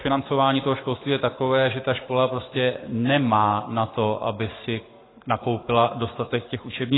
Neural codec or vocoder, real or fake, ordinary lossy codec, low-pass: codec, 44.1 kHz, 7.8 kbps, Pupu-Codec; fake; AAC, 16 kbps; 7.2 kHz